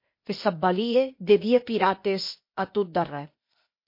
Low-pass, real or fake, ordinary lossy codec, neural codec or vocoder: 5.4 kHz; fake; MP3, 32 kbps; codec, 16 kHz, 0.8 kbps, ZipCodec